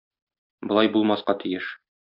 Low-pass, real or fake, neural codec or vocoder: 5.4 kHz; real; none